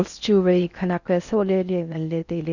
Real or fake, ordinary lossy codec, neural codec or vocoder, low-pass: fake; none; codec, 16 kHz in and 24 kHz out, 0.6 kbps, FocalCodec, streaming, 4096 codes; 7.2 kHz